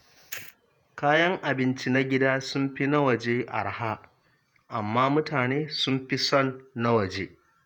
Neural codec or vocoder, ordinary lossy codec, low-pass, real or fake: vocoder, 48 kHz, 128 mel bands, Vocos; none; 19.8 kHz; fake